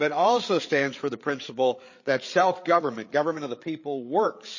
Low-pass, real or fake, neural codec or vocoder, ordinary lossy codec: 7.2 kHz; fake; codec, 16 kHz, 8 kbps, FreqCodec, larger model; MP3, 32 kbps